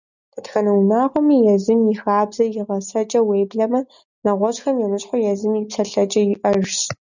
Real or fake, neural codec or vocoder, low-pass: real; none; 7.2 kHz